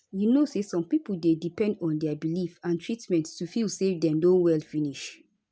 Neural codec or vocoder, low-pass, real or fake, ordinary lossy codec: none; none; real; none